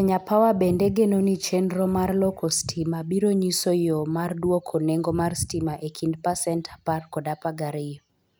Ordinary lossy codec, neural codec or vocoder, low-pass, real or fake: none; none; none; real